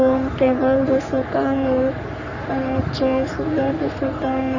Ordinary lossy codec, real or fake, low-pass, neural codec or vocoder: none; fake; 7.2 kHz; codec, 44.1 kHz, 3.4 kbps, Pupu-Codec